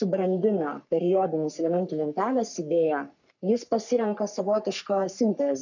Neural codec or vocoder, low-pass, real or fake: codec, 44.1 kHz, 3.4 kbps, Pupu-Codec; 7.2 kHz; fake